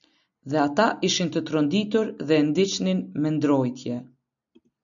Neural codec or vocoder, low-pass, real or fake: none; 7.2 kHz; real